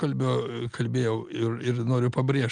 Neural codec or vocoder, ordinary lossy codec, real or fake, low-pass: none; Opus, 24 kbps; real; 9.9 kHz